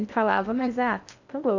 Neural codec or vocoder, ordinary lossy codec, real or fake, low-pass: codec, 16 kHz in and 24 kHz out, 0.8 kbps, FocalCodec, streaming, 65536 codes; none; fake; 7.2 kHz